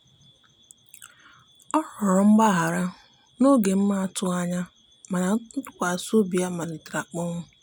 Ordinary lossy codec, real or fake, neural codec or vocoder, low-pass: none; real; none; none